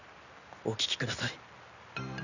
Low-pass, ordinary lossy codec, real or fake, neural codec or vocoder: 7.2 kHz; none; real; none